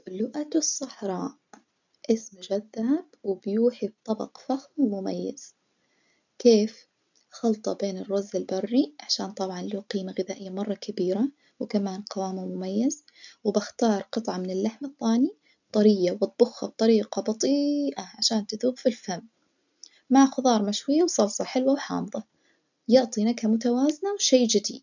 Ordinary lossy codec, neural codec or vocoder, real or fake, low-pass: none; none; real; 7.2 kHz